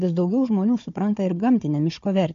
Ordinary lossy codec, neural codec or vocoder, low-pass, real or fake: MP3, 48 kbps; codec, 16 kHz, 4 kbps, FunCodec, trained on Chinese and English, 50 frames a second; 7.2 kHz; fake